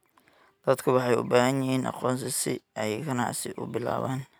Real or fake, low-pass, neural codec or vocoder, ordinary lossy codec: real; none; none; none